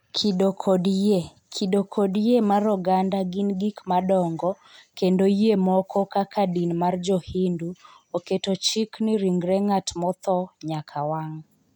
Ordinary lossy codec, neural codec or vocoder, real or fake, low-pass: none; none; real; 19.8 kHz